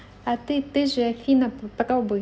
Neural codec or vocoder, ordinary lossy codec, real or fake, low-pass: none; none; real; none